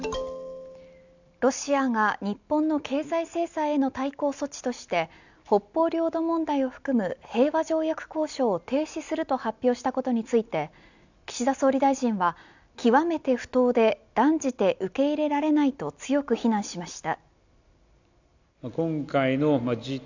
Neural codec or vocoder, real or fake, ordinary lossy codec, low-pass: none; real; none; 7.2 kHz